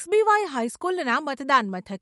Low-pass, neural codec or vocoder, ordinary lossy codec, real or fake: 19.8 kHz; autoencoder, 48 kHz, 128 numbers a frame, DAC-VAE, trained on Japanese speech; MP3, 48 kbps; fake